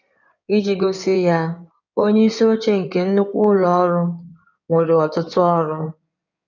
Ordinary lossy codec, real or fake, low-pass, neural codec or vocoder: none; fake; 7.2 kHz; codec, 16 kHz in and 24 kHz out, 2.2 kbps, FireRedTTS-2 codec